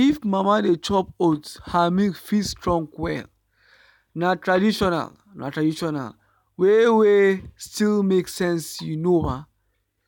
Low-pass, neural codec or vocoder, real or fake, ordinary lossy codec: 19.8 kHz; none; real; none